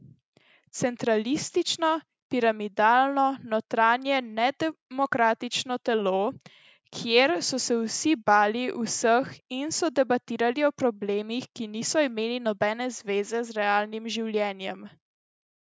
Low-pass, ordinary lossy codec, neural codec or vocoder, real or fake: none; none; none; real